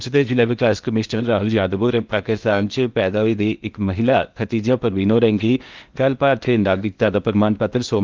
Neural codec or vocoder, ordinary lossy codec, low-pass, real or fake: codec, 16 kHz in and 24 kHz out, 0.8 kbps, FocalCodec, streaming, 65536 codes; Opus, 32 kbps; 7.2 kHz; fake